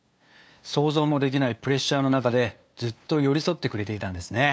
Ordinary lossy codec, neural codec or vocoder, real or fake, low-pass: none; codec, 16 kHz, 2 kbps, FunCodec, trained on LibriTTS, 25 frames a second; fake; none